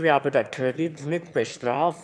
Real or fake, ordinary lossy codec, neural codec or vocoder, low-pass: fake; none; autoencoder, 22.05 kHz, a latent of 192 numbers a frame, VITS, trained on one speaker; none